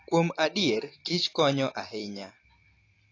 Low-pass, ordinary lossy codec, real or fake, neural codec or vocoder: 7.2 kHz; AAC, 32 kbps; real; none